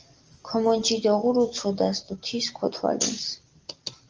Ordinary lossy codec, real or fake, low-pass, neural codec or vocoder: Opus, 24 kbps; real; 7.2 kHz; none